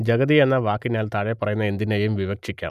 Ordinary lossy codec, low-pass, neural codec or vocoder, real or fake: none; 14.4 kHz; none; real